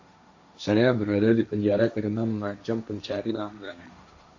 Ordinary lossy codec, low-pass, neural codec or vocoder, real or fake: AAC, 48 kbps; 7.2 kHz; codec, 16 kHz, 1.1 kbps, Voila-Tokenizer; fake